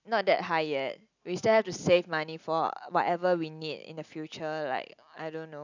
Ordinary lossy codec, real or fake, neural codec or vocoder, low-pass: none; real; none; 7.2 kHz